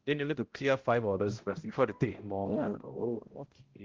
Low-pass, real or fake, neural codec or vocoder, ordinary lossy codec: 7.2 kHz; fake; codec, 16 kHz, 0.5 kbps, X-Codec, HuBERT features, trained on balanced general audio; Opus, 16 kbps